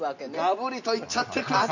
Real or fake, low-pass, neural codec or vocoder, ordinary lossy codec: real; 7.2 kHz; none; none